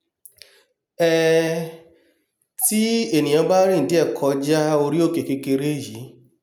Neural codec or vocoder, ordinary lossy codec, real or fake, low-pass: none; none; real; none